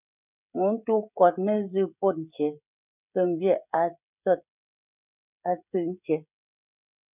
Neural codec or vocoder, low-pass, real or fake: codec, 16 kHz, 16 kbps, FreqCodec, smaller model; 3.6 kHz; fake